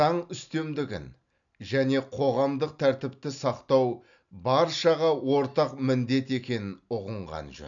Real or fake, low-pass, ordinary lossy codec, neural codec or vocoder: real; 7.2 kHz; none; none